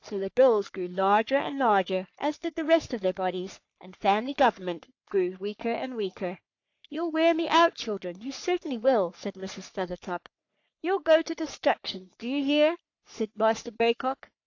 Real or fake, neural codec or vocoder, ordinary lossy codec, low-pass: fake; codec, 44.1 kHz, 3.4 kbps, Pupu-Codec; AAC, 48 kbps; 7.2 kHz